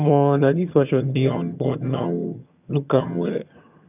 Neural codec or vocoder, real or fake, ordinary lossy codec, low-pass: vocoder, 22.05 kHz, 80 mel bands, HiFi-GAN; fake; none; 3.6 kHz